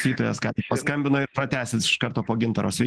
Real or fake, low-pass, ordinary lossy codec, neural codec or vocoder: fake; 10.8 kHz; Opus, 16 kbps; autoencoder, 48 kHz, 128 numbers a frame, DAC-VAE, trained on Japanese speech